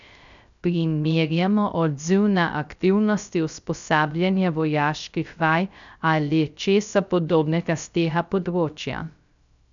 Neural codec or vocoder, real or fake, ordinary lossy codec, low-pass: codec, 16 kHz, 0.3 kbps, FocalCodec; fake; none; 7.2 kHz